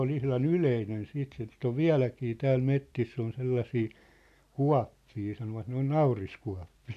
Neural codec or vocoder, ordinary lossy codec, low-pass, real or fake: none; none; 14.4 kHz; real